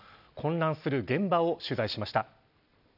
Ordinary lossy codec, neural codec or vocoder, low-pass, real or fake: none; none; 5.4 kHz; real